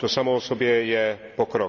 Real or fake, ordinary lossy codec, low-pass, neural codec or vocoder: real; none; 7.2 kHz; none